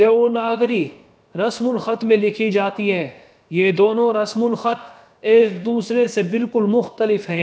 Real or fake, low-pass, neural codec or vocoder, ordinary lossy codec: fake; none; codec, 16 kHz, about 1 kbps, DyCAST, with the encoder's durations; none